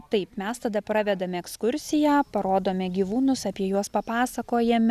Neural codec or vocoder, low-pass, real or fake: none; 14.4 kHz; real